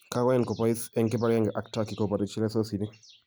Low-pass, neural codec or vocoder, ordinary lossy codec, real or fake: none; none; none; real